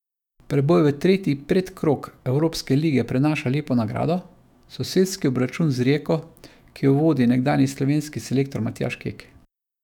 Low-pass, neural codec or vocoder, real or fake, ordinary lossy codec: 19.8 kHz; autoencoder, 48 kHz, 128 numbers a frame, DAC-VAE, trained on Japanese speech; fake; none